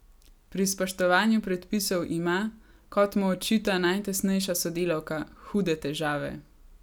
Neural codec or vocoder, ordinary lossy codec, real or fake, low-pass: none; none; real; none